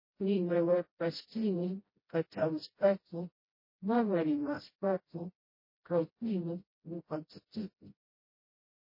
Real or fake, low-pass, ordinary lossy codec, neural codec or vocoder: fake; 5.4 kHz; MP3, 24 kbps; codec, 16 kHz, 0.5 kbps, FreqCodec, smaller model